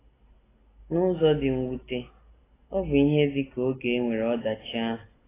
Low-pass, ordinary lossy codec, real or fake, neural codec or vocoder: 3.6 kHz; AAC, 16 kbps; real; none